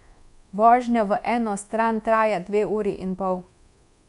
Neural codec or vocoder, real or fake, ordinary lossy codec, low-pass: codec, 24 kHz, 1.2 kbps, DualCodec; fake; none; 10.8 kHz